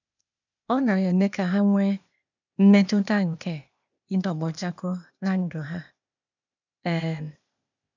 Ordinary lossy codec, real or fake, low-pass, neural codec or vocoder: none; fake; 7.2 kHz; codec, 16 kHz, 0.8 kbps, ZipCodec